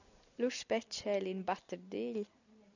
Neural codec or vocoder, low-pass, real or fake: none; 7.2 kHz; real